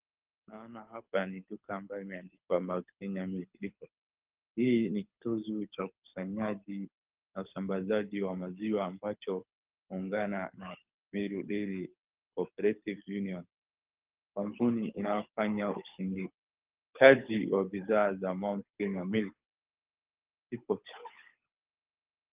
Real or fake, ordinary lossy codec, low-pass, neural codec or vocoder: fake; Opus, 32 kbps; 3.6 kHz; codec, 24 kHz, 6 kbps, HILCodec